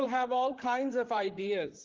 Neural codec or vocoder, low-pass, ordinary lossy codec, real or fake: vocoder, 44.1 kHz, 128 mel bands, Pupu-Vocoder; 7.2 kHz; Opus, 16 kbps; fake